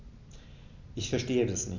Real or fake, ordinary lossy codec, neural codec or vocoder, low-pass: real; none; none; 7.2 kHz